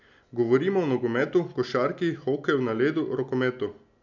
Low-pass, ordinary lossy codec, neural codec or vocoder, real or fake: 7.2 kHz; none; none; real